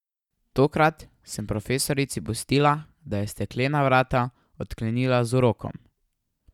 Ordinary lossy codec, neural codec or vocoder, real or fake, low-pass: none; none; real; 19.8 kHz